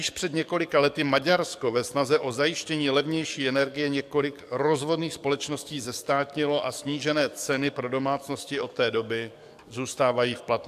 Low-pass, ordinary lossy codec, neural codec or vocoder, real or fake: 14.4 kHz; AAC, 96 kbps; codec, 44.1 kHz, 7.8 kbps, DAC; fake